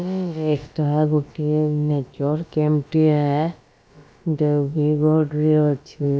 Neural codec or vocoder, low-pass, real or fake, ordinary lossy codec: codec, 16 kHz, about 1 kbps, DyCAST, with the encoder's durations; none; fake; none